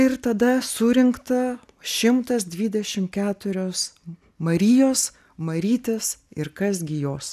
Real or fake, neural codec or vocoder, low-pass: real; none; 14.4 kHz